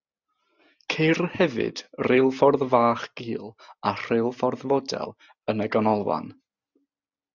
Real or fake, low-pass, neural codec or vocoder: real; 7.2 kHz; none